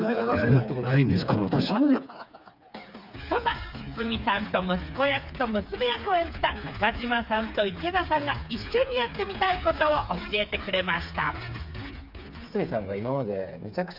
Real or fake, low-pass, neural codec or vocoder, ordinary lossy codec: fake; 5.4 kHz; codec, 16 kHz, 4 kbps, FreqCodec, smaller model; none